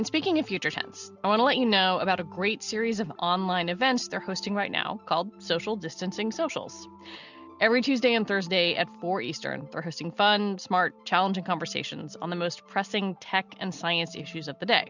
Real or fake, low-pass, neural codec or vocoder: real; 7.2 kHz; none